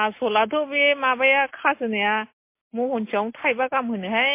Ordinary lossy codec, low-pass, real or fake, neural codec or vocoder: MP3, 24 kbps; 3.6 kHz; real; none